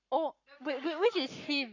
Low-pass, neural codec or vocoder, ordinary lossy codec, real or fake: 7.2 kHz; codec, 44.1 kHz, 7.8 kbps, Pupu-Codec; none; fake